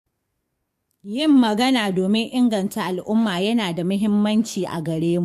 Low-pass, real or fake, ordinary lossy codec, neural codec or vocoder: 14.4 kHz; fake; MP3, 64 kbps; codec, 44.1 kHz, 7.8 kbps, DAC